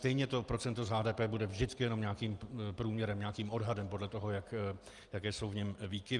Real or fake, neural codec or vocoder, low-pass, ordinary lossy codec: real; none; 10.8 kHz; Opus, 32 kbps